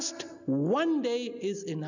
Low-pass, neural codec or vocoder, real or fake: 7.2 kHz; none; real